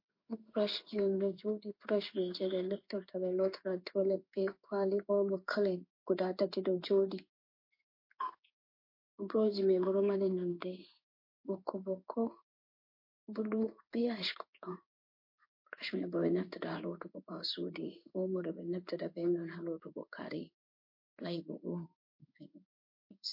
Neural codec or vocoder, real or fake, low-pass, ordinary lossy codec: codec, 16 kHz in and 24 kHz out, 1 kbps, XY-Tokenizer; fake; 5.4 kHz; MP3, 32 kbps